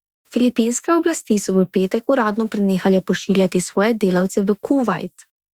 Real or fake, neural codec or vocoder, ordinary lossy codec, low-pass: fake; autoencoder, 48 kHz, 32 numbers a frame, DAC-VAE, trained on Japanese speech; Opus, 64 kbps; 19.8 kHz